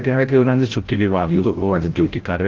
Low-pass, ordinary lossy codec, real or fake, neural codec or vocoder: 7.2 kHz; Opus, 16 kbps; fake; codec, 16 kHz, 0.5 kbps, FreqCodec, larger model